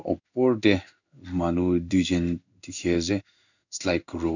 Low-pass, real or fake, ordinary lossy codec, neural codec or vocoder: 7.2 kHz; fake; none; codec, 16 kHz in and 24 kHz out, 1 kbps, XY-Tokenizer